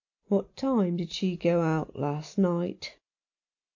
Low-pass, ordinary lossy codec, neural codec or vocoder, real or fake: 7.2 kHz; AAC, 48 kbps; none; real